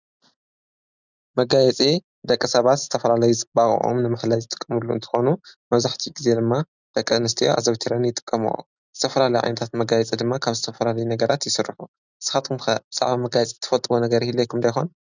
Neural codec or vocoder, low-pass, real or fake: none; 7.2 kHz; real